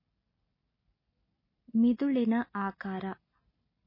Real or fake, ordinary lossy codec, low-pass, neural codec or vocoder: real; MP3, 24 kbps; 5.4 kHz; none